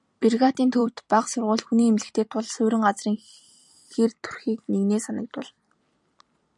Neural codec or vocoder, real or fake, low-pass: vocoder, 44.1 kHz, 128 mel bands every 256 samples, BigVGAN v2; fake; 10.8 kHz